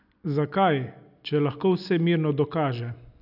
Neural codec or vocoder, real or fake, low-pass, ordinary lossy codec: none; real; 5.4 kHz; none